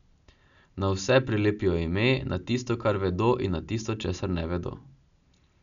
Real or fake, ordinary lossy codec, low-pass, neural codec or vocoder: real; none; 7.2 kHz; none